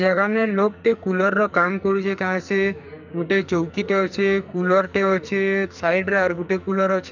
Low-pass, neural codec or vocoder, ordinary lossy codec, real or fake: 7.2 kHz; codec, 44.1 kHz, 2.6 kbps, SNAC; none; fake